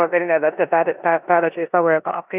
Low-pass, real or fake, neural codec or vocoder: 3.6 kHz; fake; codec, 16 kHz in and 24 kHz out, 0.9 kbps, LongCat-Audio-Codec, four codebook decoder